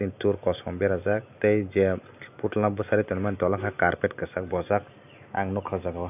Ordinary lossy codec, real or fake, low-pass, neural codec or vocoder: none; real; 3.6 kHz; none